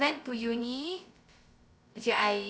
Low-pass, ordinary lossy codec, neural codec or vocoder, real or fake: none; none; codec, 16 kHz, 0.2 kbps, FocalCodec; fake